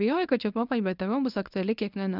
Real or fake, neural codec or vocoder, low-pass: fake; codec, 24 kHz, 0.9 kbps, WavTokenizer, medium speech release version 1; 5.4 kHz